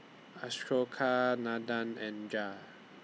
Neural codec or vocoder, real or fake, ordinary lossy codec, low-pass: none; real; none; none